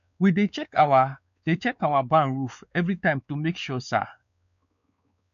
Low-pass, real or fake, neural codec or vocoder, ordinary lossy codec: 7.2 kHz; fake; codec, 16 kHz, 4 kbps, X-Codec, WavLM features, trained on Multilingual LibriSpeech; none